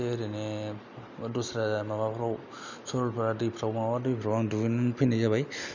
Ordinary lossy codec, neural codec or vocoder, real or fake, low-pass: none; none; real; 7.2 kHz